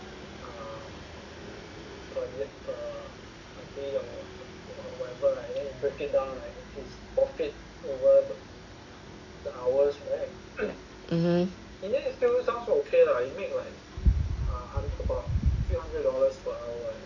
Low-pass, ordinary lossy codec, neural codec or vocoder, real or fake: 7.2 kHz; none; none; real